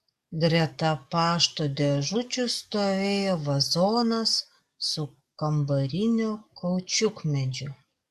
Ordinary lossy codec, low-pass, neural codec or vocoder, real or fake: Opus, 64 kbps; 14.4 kHz; codec, 44.1 kHz, 7.8 kbps, DAC; fake